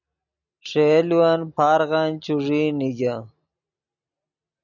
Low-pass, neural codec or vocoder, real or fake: 7.2 kHz; none; real